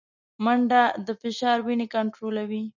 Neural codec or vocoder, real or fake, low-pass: none; real; 7.2 kHz